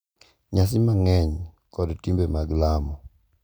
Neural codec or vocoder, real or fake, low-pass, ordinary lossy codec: none; real; none; none